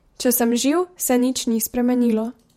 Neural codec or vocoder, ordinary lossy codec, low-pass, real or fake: vocoder, 48 kHz, 128 mel bands, Vocos; MP3, 64 kbps; 19.8 kHz; fake